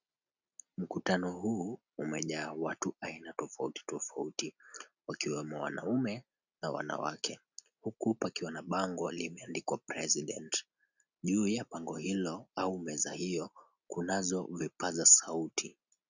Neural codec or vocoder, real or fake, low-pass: none; real; 7.2 kHz